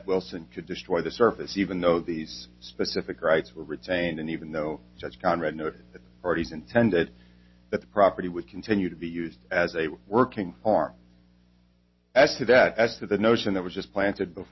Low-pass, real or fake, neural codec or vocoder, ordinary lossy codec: 7.2 kHz; real; none; MP3, 24 kbps